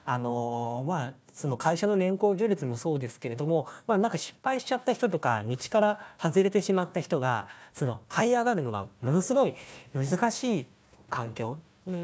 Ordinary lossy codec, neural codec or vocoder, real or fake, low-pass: none; codec, 16 kHz, 1 kbps, FunCodec, trained on Chinese and English, 50 frames a second; fake; none